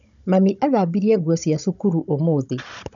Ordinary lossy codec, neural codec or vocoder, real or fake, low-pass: none; codec, 16 kHz, 16 kbps, FunCodec, trained on Chinese and English, 50 frames a second; fake; 7.2 kHz